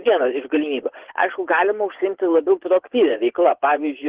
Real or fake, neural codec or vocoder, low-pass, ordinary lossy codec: fake; vocoder, 24 kHz, 100 mel bands, Vocos; 3.6 kHz; Opus, 16 kbps